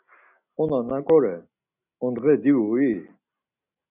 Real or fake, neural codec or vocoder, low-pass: real; none; 3.6 kHz